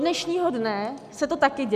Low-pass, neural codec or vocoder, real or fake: 14.4 kHz; none; real